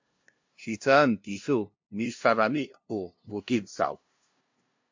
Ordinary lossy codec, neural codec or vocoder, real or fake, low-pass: MP3, 48 kbps; codec, 16 kHz, 0.5 kbps, FunCodec, trained on LibriTTS, 25 frames a second; fake; 7.2 kHz